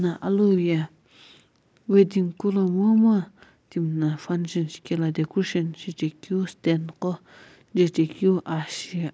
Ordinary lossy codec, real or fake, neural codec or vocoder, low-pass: none; real; none; none